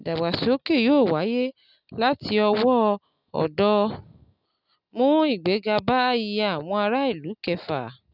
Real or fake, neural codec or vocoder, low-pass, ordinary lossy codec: real; none; 5.4 kHz; none